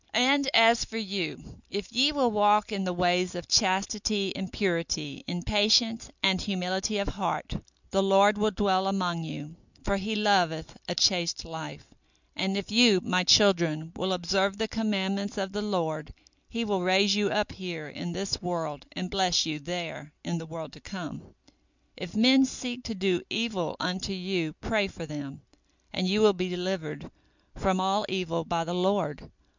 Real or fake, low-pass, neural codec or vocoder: real; 7.2 kHz; none